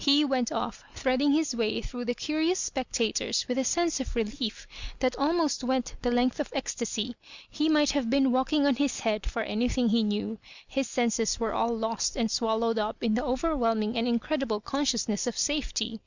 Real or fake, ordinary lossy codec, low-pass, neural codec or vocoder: real; Opus, 64 kbps; 7.2 kHz; none